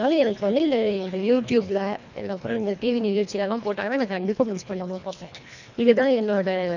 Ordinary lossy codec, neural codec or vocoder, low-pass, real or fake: none; codec, 24 kHz, 1.5 kbps, HILCodec; 7.2 kHz; fake